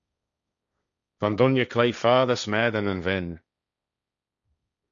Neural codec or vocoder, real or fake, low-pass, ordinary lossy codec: codec, 16 kHz, 1.1 kbps, Voila-Tokenizer; fake; 7.2 kHz; MP3, 96 kbps